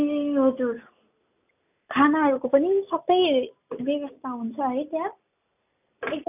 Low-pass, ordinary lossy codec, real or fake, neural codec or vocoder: 3.6 kHz; none; real; none